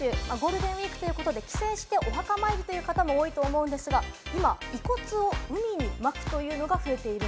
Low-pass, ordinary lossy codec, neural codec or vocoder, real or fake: none; none; none; real